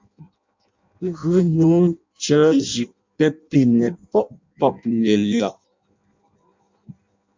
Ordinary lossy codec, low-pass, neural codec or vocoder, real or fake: MP3, 64 kbps; 7.2 kHz; codec, 16 kHz in and 24 kHz out, 0.6 kbps, FireRedTTS-2 codec; fake